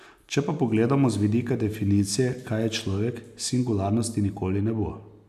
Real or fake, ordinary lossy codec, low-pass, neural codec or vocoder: real; none; 14.4 kHz; none